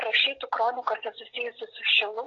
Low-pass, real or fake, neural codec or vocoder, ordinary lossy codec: 7.2 kHz; real; none; AAC, 32 kbps